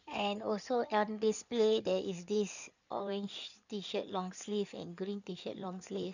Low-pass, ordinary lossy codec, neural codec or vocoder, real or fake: 7.2 kHz; none; codec, 16 kHz in and 24 kHz out, 2.2 kbps, FireRedTTS-2 codec; fake